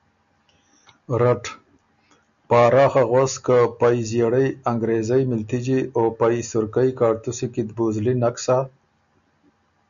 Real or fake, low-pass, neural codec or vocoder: real; 7.2 kHz; none